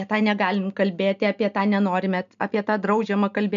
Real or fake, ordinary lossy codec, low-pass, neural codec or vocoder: real; MP3, 96 kbps; 7.2 kHz; none